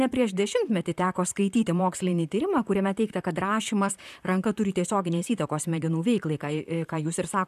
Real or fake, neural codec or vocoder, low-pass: fake; vocoder, 44.1 kHz, 128 mel bands, Pupu-Vocoder; 14.4 kHz